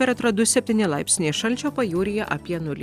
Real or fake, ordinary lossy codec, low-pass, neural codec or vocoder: real; Opus, 64 kbps; 14.4 kHz; none